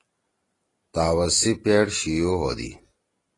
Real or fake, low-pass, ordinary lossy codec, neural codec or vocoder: real; 10.8 kHz; AAC, 32 kbps; none